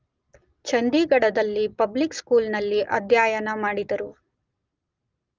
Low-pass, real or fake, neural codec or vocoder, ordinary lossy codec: 7.2 kHz; real; none; Opus, 32 kbps